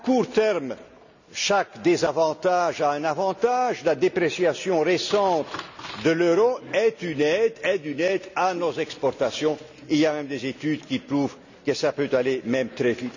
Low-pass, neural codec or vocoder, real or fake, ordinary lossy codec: 7.2 kHz; none; real; none